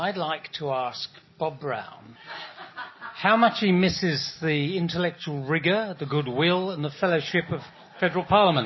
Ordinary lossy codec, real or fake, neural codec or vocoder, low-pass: MP3, 24 kbps; real; none; 7.2 kHz